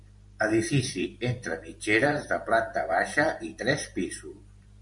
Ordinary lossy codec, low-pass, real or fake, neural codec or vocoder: MP3, 96 kbps; 10.8 kHz; fake; vocoder, 44.1 kHz, 128 mel bands every 512 samples, BigVGAN v2